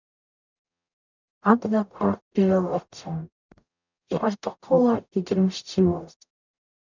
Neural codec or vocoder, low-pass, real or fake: codec, 44.1 kHz, 0.9 kbps, DAC; 7.2 kHz; fake